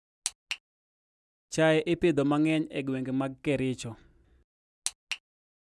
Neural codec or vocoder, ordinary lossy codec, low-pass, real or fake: none; none; none; real